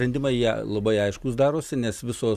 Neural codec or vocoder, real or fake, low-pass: none; real; 14.4 kHz